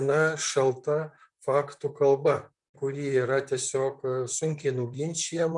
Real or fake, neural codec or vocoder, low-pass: fake; vocoder, 44.1 kHz, 128 mel bands, Pupu-Vocoder; 10.8 kHz